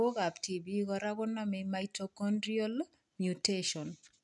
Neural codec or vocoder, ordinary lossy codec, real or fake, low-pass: none; none; real; 10.8 kHz